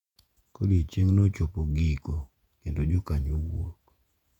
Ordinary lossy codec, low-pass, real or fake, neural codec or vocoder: none; 19.8 kHz; fake; vocoder, 48 kHz, 128 mel bands, Vocos